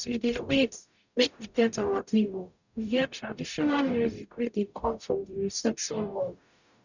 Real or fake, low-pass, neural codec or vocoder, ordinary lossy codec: fake; 7.2 kHz; codec, 44.1 kHz, 0.9 kbps, DAC; none